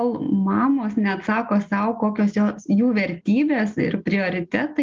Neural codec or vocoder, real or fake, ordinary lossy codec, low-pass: none; real; Opus, 24 kbps; 7.2 kHz